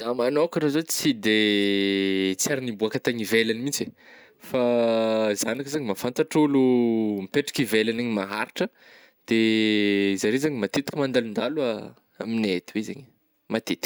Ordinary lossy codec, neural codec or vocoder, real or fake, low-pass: none; none; real; none